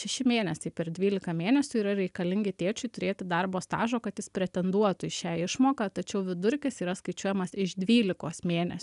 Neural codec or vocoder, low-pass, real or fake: none; 10.8 kHz; real